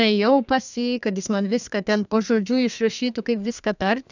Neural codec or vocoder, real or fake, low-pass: codec, 32 kHz, 1.9 kbps, SNAC; fake; 7.2 kHz